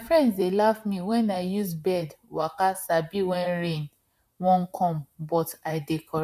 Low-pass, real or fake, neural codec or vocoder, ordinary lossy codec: 14.4 kHz; fake; vocoder, 44.1 kHz, 128 mel bands, Pupu-Vocoder; MP3, 96 kbps